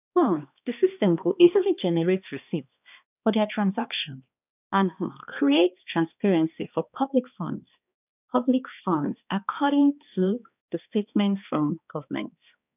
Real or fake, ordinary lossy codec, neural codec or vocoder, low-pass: fake; none; codec, 16 kHz, 2 kbps, X-Codec, HuBERT features, trained on balanced general audio; 3.6 kHz